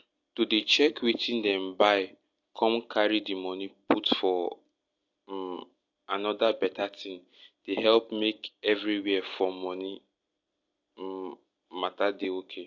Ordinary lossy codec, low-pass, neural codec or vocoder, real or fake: AAC, 48 kbps; 7.2 kHz; none; real